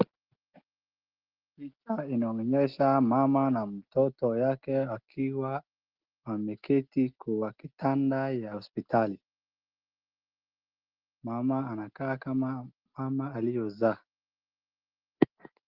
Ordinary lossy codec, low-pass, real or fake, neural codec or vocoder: Opus, 16 kbps; 5.4 kHz; real; none